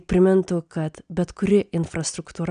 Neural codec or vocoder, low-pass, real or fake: none; 9.9 kHz; real